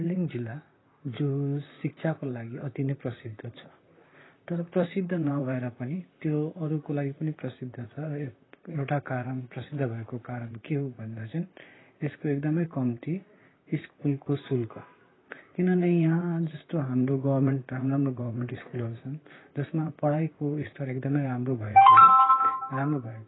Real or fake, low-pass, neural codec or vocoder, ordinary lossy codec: fake; 7.2 kHz; vocoder, 44.1 kHz, 128 mel bands, Pupu-Vocoder; AAC, 16 kbps